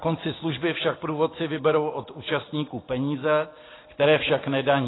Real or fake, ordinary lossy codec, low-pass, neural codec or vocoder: real; AAC, 16 kbps; 7.2 kHz; none